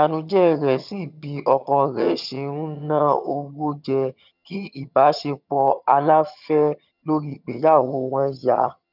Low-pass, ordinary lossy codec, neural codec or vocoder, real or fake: 5.4 kHz; none; vocoder, 22.05 kHz, 80 mel bands, HiFi-GAN; fake